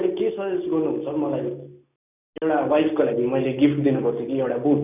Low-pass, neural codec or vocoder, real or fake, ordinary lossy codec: 3.6 kHz; none; real; none